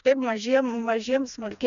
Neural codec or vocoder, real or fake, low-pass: codec, 16 kHz, 2 kbps, FreqCodec, smaller model; fake; 7.2 kHz